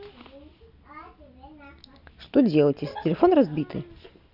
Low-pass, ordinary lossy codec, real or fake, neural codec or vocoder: 5.4 kHz; none; real; none